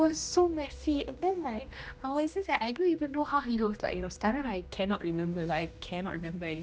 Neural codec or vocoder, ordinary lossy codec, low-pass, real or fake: codec, 16 kHz, 1 kbps, X-Codec, HuBERT features, trained on general audio; none; none; fake